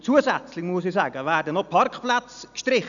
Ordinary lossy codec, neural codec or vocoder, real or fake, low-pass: none; none; real; 7.2 kHz